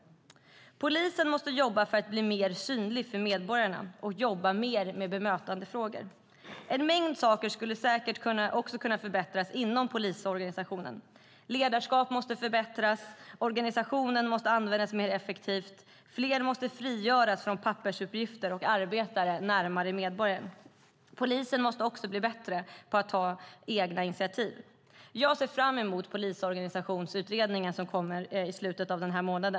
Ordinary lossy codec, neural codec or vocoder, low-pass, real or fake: none; none; none; real